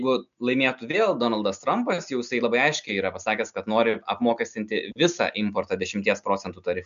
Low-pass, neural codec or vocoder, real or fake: 7.2 kHz; none; real